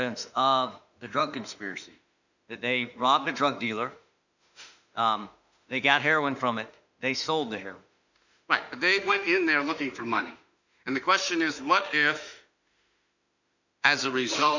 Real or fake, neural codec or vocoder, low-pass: fake; autoencoder, 48 kHz, 32 numbers a frame, DAC-VAE, trained on Japanese speech; 7.2 kHz